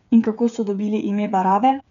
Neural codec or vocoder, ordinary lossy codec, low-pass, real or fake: codec, 16 kHz, 8 kbps, FreqCodec, smaller model; none; 7.2 kHz; fake